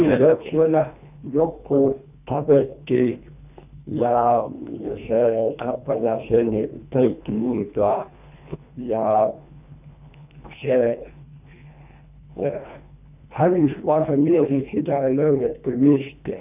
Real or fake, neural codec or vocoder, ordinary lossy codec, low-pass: fake; codec, 24 kHz, 1.5 kbps, HILCodec; none; 3.6 kHz